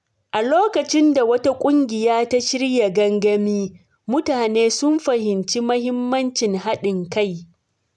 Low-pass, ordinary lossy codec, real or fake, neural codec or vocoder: none; none; real; none